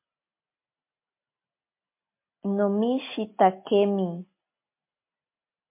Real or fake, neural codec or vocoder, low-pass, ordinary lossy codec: real; none; 3.6 kHz; MP3, 24 kbps